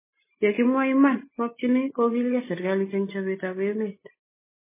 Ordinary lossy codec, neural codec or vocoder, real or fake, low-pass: MP3, 16 kbps; none; real; 3.6 kHz